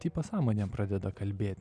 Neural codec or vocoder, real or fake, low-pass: none; real; 9.9 kHz